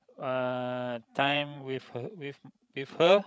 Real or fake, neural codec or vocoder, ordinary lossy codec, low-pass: fake; codec, 16 kHz, 16 kbps, FreqCodec, larger model; none; none